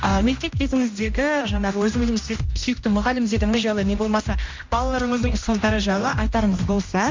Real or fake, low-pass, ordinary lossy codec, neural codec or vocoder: fake; 7.2 kHz; MP3, 48 kbps; codec, 16 kHz, 1 kbps, X-Codec, HuBERT features, trained on general audio